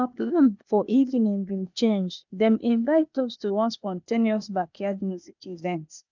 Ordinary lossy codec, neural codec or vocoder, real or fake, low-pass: none; codec, 16 kHz, 0.8 kbps, ZipCodec; fake; 7.2 kHz